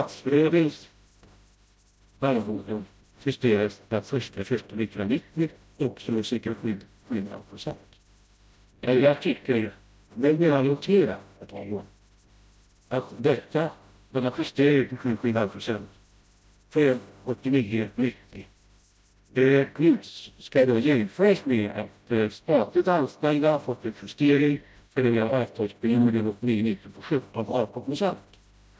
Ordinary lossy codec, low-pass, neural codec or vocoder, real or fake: none; none; codec, 16 kHz, 0.5 kbps, FreqCodec, smaller model; fake